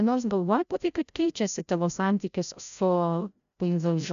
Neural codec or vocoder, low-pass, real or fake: codec, 16 kHz, 0.5 kbps, FreqCodec, larger model; 7.2 kHz; fake